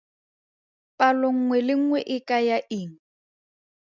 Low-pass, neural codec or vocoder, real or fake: 7.2 kHz; none; real